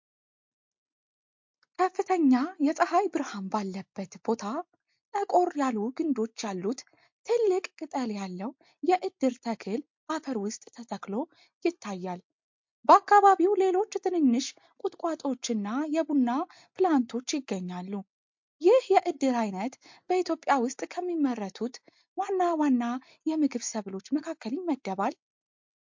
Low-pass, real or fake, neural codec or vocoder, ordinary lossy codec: 7.2 kHz; real; none; MP3, 48 kbps